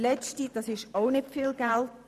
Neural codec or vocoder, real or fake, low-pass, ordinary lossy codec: vocoder, 44.1 kHz, 128 mel bands every 512 samples, BigVGAN v2; fake; 14.4 kHz; none